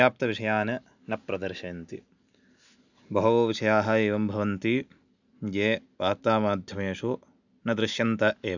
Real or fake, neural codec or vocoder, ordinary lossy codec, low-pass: real; none; none; 7.2 kHz